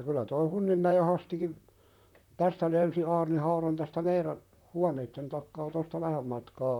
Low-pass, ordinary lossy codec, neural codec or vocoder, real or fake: 19.8 kHz; none; vocoder, 44.1 kHz, 128 mel bands, Pupu-Vocoder; fake